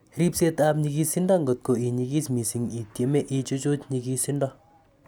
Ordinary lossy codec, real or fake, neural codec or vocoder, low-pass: none; real; none; none